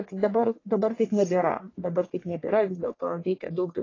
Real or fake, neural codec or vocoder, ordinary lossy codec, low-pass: fake; codec, 44.1 kHz, 1.7 kbps, Pupu-Codec; AAC, 32 kbps; 7.2 kHz